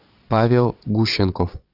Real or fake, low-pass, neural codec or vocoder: fake; 5.4 kHz; vocoder, 22.05 kHz, 80 mel bands, Vocos